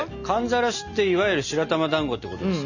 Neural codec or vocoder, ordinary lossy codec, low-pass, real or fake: none; none; 7.2 kHz; real